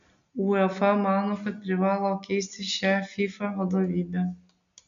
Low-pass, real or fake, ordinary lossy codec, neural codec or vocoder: 7.2 kHz; real; MP3, 64 kbps; none